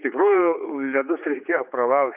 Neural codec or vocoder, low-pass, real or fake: codec, 16 kHz, 4 kbps, X-Codec, HuBERT features, trained on general audio; 3.6 kHz; fake